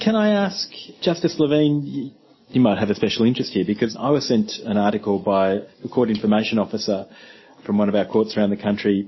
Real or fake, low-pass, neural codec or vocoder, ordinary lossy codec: real; 7.2 kHz; none; MP3, 24 kbps